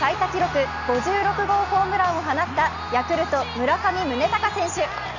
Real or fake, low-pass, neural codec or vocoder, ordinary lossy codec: real; 7.2 kHz; none; none